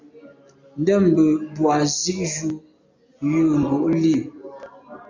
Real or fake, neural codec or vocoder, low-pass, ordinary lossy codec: real; none; 7.2 kHz; MP3, 64 kbps